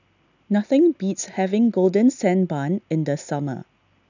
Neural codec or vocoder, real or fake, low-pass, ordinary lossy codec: none; real; 7.2 kHz; none